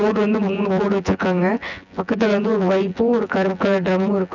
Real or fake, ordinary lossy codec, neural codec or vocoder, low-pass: fake; none; vocoder, 24 kHz, 100 mel bands, Vocos; 7.2 kHz